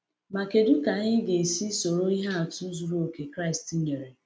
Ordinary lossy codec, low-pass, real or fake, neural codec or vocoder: none; none; real; none